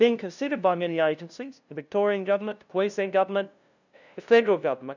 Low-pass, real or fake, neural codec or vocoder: 7.2 kHz; fake; codec, 16 kHz, 0.5 kbps, FunCodec, trained on LibriTTS, 25 frames a second